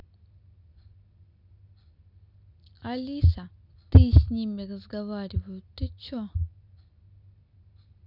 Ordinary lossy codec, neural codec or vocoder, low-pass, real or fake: none; none; 5.4 kHz; real